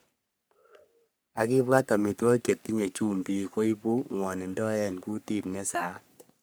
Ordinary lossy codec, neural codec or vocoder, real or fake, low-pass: none; codec, 44.1 kHz, 3.4 kbps, Pupu-Codec; fake; none